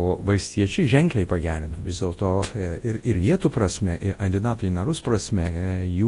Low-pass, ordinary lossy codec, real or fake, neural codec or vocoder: 10.8 kHz; AAC, 32 kbps; fake; codec, 24 kHz, 0.9 kbps, WavTokenizer, large speech release